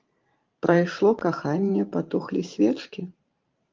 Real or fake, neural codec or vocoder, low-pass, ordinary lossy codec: fake; vocoder, 44.1 kHz, 128 mel bands every 512 samples, BigVGAN v2; 7.2 kHz; Opus, 32 kbps